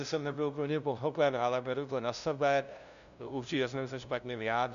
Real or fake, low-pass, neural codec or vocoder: fake; 7.2 kHz; codec, 16 kHz, 0.5 kbps, FunCodec, trained on LibriTTS, 25 frames a second